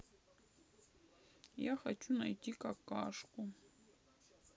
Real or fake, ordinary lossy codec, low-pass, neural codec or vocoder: real; none; none; none